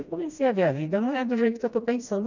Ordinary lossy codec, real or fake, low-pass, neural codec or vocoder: none; fake; 7.2 kHz; codec, 16 kHz, 1 kbps, FreqCodec, smaller model